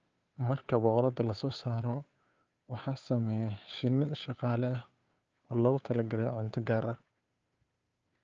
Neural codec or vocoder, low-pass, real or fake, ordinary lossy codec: codec, 16 kHz, 2 kbps, FunCodec, trained on Chinese and English, 25 frames a second; 7.2 kHz; fake; Opus, 24 kbps